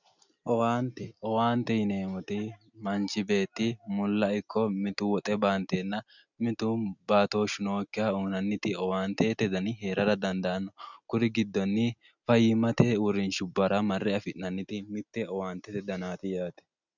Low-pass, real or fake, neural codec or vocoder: 7.2 kHz; real; none